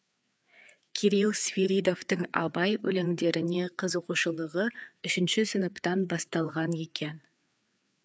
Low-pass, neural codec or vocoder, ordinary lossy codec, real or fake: none; codec, 16 kHz, 4 kbps, FreqCodec, larger model; none; fake